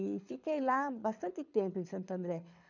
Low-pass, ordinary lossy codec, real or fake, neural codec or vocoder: 7.2 kHz; none; fake; codec, 24 kHz, 6 kbps, HILCodec